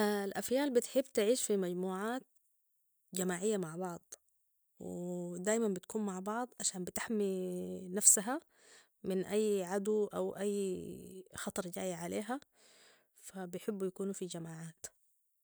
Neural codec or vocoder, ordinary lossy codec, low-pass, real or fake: none; none; none; real